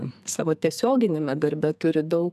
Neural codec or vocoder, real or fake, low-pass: codec, 44.1 kHz, 2.6 kbps, SNAC; fake; 14.4 kHz